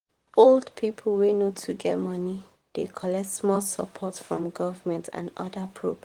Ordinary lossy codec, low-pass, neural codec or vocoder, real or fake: Opus, 24 kbps; 19.8 kHz; vocoder, 44.1 kHz, 128 mel bands, Pupu-Vocoder; fake